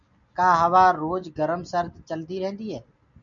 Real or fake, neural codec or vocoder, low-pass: real; none; 7.2 kHz